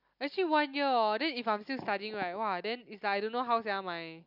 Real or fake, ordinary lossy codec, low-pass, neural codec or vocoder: real; none; 5.4 kHz; none